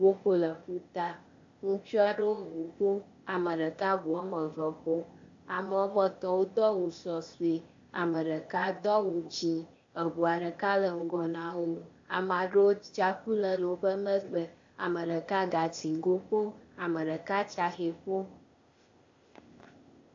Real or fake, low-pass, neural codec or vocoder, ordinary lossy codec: fake; 7.2 kHz; codec, 16 kHz, 0.8 kbps, ZipCodec; AAC, 48 kbps